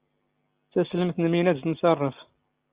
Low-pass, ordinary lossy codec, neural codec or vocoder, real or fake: 3.6 kHz; Opus, 32 kbps; none; real